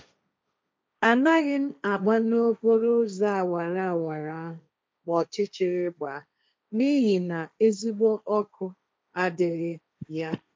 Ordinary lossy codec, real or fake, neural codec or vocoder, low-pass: none; fake; codec, 16 kHz, 1.1 kbps, Voila-Tokenizer; none